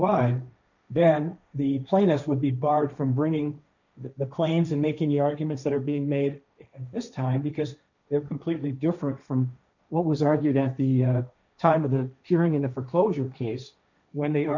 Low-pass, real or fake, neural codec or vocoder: 7.2 kHz; fake; codec, 16 kHz, 1.1 kbps, Voila-Tokenizer